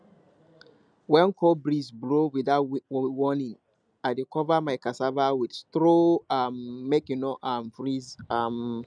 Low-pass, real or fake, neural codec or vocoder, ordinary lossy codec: 9.9 kHz; real; none; none